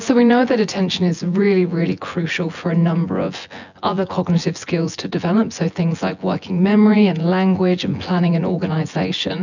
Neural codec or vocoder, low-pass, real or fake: vocoder, 24 kHz, 100 mel bands, Vocos; 7.2 kHz; fake